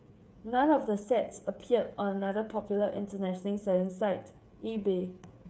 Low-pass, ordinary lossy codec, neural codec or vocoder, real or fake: none; none; codec, 16 kHz, 8 kbps, FreqCodec, smaller model; fake